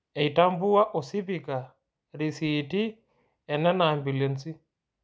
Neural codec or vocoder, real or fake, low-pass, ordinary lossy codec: none; real; none; none